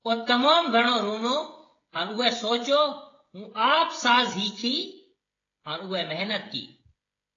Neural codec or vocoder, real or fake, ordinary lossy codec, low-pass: codec, 16 kHz, 16 kbps, FreqCodec, smaller model; fake; AAC, 32 kbps; 7.2 kHz